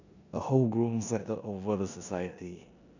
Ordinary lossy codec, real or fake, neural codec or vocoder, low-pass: none; fake; codec, 16 kHz in and 24 kHz out, 0.9 kbps, LongCat-Audio-Codec, four codebook decoder; 7.2 kHz